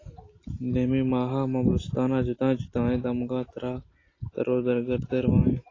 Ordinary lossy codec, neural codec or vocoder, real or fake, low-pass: AAC, 32 kbps; none; real; 7.2 kHz